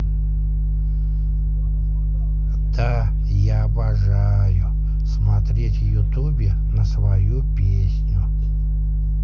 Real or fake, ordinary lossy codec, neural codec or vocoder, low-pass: real; none; none; 7.2 kHz